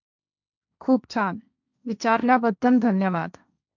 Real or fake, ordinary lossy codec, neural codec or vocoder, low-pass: fake; none; codec, 16 kHz, 1.1 kbps, Voila-Tokenizer; 7.2 kHz